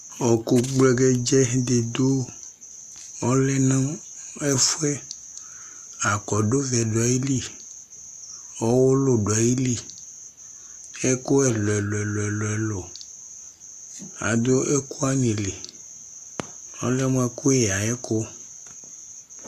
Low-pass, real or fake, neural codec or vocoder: 14.4 kHz; real; none